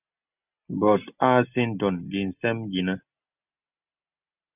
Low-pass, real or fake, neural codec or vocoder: 3.6 kHz; real; none